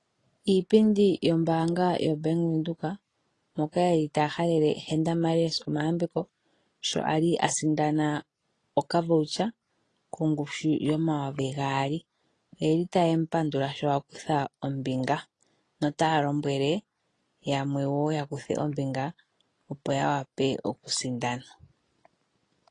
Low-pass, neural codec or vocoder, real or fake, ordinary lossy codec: 10.8 kHz; none; real; AAC, 32 kbps